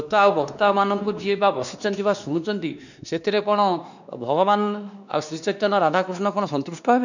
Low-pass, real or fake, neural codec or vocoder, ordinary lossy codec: 7.2 kHz; fake; codec, 16 kHz, 2 kbps, X-Codec, WavLM features, trained on Multilingual LibriSpeech; none